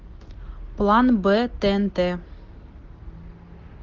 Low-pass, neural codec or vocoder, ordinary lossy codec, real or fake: 7.2 kHz; none; Opus, 16 kbps; real